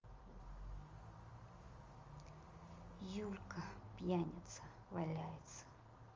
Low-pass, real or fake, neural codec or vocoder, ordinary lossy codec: 7.2 kHz; real; none; Opus, 32 kbps